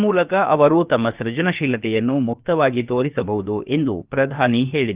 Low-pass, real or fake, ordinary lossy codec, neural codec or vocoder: 3.6 kHz; fake; Opus, 32 kbps; codec, 16 kHz, about 1 kbps, DyCAST, with the encoder's durations